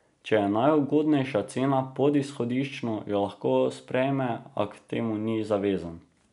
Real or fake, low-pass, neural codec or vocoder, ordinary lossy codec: real; 10.8 kHz; none; none